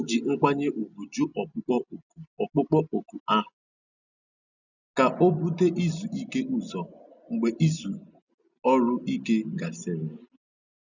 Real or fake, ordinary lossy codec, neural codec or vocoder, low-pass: real; none; none; 7.2 kHz